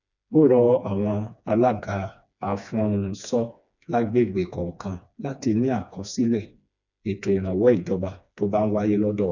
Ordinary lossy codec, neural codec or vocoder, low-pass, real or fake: none; codec, 16 kHz, 2 kbps, FreqCodec, smaller model; 7.2 kHz; fake